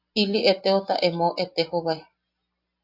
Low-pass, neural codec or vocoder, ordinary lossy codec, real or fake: 5.4 kHz; none; AAC, 48 kbps; real